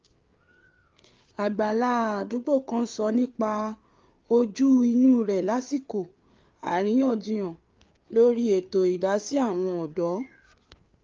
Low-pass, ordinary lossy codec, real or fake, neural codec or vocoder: 7.2 kHz; Opus, 24 kbps; fake; codec, 16 kHz, 4 kbps, FreqCodec, larger model